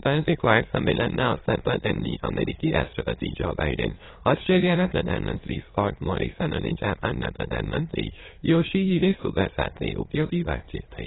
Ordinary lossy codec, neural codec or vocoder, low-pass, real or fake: AAC, 16 kbps; autoencoder, 22.05 kHz, a latent of 192 numbers a frame, VITS, trained on many speakers; 7.2 kHz; fake